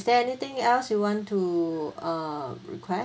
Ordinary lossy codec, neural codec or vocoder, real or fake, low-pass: none; none; real; none